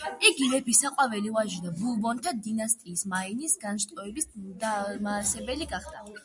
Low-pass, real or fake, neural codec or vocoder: 10.8 kHz; real; none